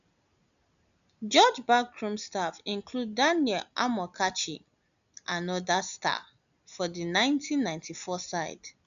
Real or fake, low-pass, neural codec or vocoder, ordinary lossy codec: real; 7.2 kHz; none; none